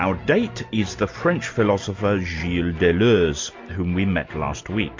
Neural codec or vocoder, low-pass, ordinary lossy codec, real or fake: none; 7.2 kHz; MP3, 48 kbps; real